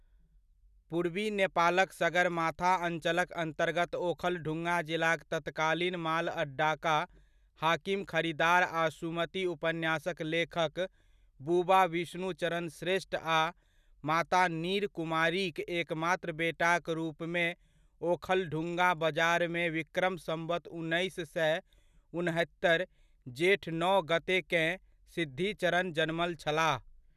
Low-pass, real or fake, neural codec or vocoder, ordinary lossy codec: 14.4 kHz; real; none; none